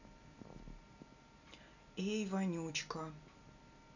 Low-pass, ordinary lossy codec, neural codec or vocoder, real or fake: 7.2 kHz; none; none; real